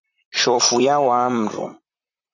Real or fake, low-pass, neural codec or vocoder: fake; 7.2 kHz; vocoder, 44.1 kHz, 128 mel bands, Pupu-Vocoder